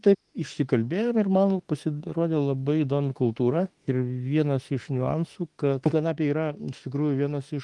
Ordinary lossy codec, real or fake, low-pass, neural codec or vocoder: Opus, 24 kbps; fake; 10.8 kHz; autoencoder, 48 kHz, 32 numbers a frame, DAC-VAE, trained on Japanese speech